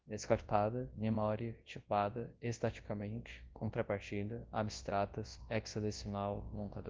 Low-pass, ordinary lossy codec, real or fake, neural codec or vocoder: 7.2 kHz; Opus, 16 kbps; fake; codec, 24 kHz, 0.9 kbps, WavTokenizer, large speech release